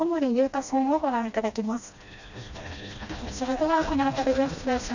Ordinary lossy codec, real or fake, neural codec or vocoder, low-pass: none; fake; codec, 16 kHz, 1 kbps, FreqCodec, smaller model; 7.2 kHz